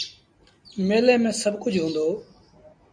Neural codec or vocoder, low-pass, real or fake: none; 9.9 kHz; real